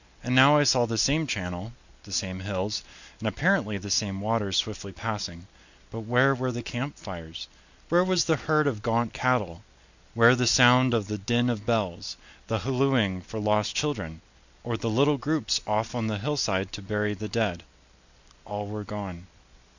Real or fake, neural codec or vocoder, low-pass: real; none; 7.2 kHz